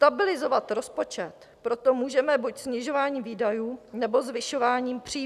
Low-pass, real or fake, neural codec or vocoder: 14.4 kHz; real; none